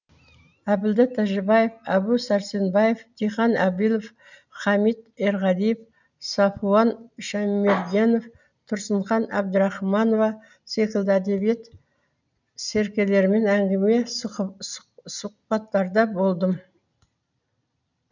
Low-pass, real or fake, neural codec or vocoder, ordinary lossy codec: 7.2 kHz; real; none; none